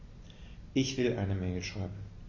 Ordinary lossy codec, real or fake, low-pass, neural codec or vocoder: MP3, 32 kbps; real; 7.2 kHz; none